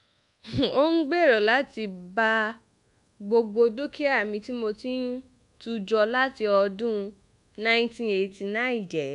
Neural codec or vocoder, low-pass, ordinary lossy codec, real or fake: codec, 24 kHz, 1.2 kbps, DualCodec; 10.8 kHz; MP3, 96 kbps; fake